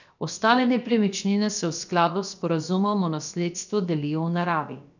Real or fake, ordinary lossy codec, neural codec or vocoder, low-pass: fake; none; codec, 16 kHz, about 1 kbps, DyCAST, with the encoder's durations; 7.2 kHz